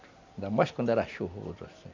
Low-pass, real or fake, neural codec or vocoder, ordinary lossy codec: 7.2 kHz; real; none; MP3, 64 kbps